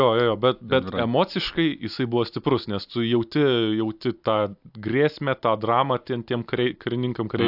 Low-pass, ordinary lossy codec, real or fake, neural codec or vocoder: 5.4 kHz; AAC, 48 kbps; real; none